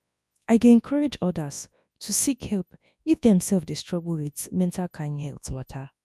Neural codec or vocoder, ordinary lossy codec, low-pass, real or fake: codec, 24 kHz, 0.9 kbps, WavTokenizer, large speech release; none; none; fake